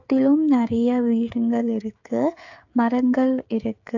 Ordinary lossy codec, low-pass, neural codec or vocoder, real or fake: AAC, 48 kbps; 7.2 kHz; codec, 16 kHz, 16 kbps, FreqCodec, smaller model; fake